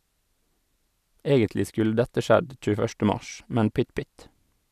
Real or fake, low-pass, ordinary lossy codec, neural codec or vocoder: real; 14.4 kHz; none; none